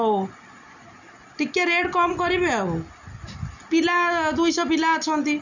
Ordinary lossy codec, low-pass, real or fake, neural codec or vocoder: none; 7.2 kHz; real; none